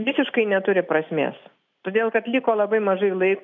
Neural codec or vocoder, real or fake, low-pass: none; real; 7.2 kHz